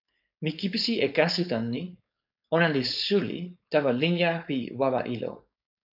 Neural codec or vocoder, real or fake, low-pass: codec, 16 kHz, 4.8 kbps, FACodec; fake; 5.4 kHz